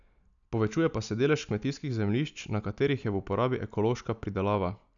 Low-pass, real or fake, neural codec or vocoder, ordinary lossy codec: 7.2 kHz; real; none; none